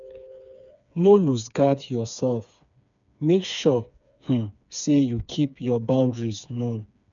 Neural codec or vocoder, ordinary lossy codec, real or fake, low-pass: codec, 16 kHz, 4 kbps, FreqCodec, smaller model; none; fake; 7.2 kHz